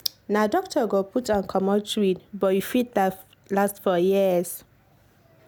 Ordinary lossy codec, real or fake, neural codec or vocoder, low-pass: none; real; none; none